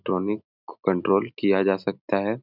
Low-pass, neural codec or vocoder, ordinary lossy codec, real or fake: 5.4 kHz; none; none; real